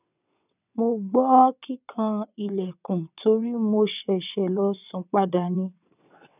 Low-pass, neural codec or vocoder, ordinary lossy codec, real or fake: 3.6 kHz; vocoder, 22.05 kHz, 80 mel bands, WaveNeXt; none; fake